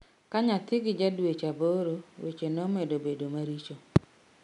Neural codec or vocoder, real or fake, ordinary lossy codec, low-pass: none; real; none; 10.8 kHz